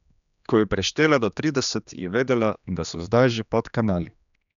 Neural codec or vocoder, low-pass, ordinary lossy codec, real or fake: codec, 16 kHz, 2 kbps, X-Codec, HuBERT features, trained on general audio; 7.2 kHz; none; fake